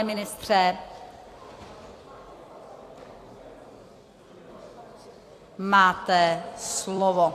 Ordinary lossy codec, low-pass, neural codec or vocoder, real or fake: AAC, 64 kbps; 14.4 kHz; vocoder, 44.1 kHz, 128 mel bands every 256 samples, BigVGAN v2; fake